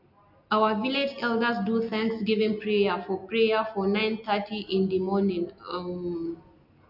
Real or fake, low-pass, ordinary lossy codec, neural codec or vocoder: real; 5.4 kHz; none; none